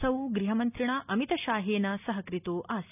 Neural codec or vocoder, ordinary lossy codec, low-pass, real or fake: none; none; 3.6 kHz; real